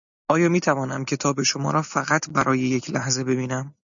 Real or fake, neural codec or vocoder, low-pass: real; none; 7.2 kHz